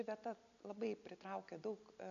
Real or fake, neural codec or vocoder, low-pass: real; none; 7.2 kHz